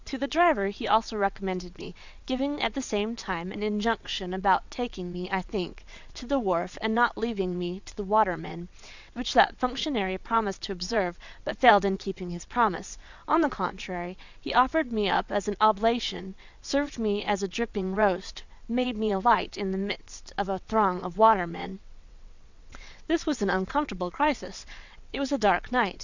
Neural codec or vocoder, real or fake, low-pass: vocoder, 22.05 kHz, 80 mel bands, WaveNeXt; fake; 7.2 kHz